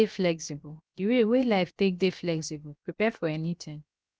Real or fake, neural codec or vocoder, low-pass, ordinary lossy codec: fake; codec, 16 kHz, 0.7 kbps, FocalCodec; none; none